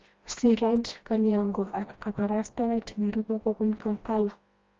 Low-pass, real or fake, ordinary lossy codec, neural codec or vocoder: 7.2 kHz; fake; Opus, 32 kbps; codec, 16 kHz, 1 kbps, FreqCodec, smaller model